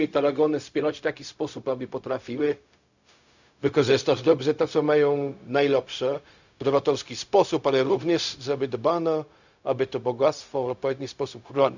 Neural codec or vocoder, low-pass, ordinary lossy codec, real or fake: codec, 16 kHz, 0.4 kbps, LongCat-Audio-Codec; 7.2 kHz; none; fake